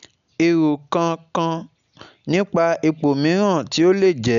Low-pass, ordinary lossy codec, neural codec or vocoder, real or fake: 7.2 kHz; none; none; real